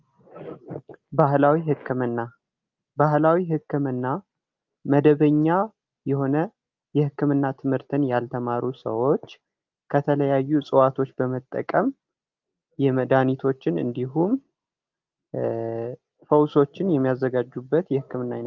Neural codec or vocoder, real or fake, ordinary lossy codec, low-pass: none; real; Opus, 24 kbps; 7.2 kHz